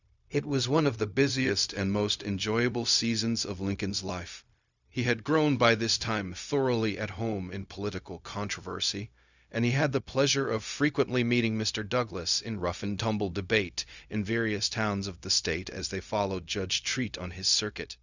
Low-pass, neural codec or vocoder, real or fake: 7.2 kHz; codec, 16 kHz, 0.4 kbps, LongCat-Audio-Codec; fake